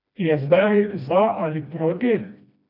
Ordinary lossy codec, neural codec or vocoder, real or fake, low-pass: none; codec, 16 kHz, 1 kbps, FreqCodec, smaller model; fake; 5.4 kHz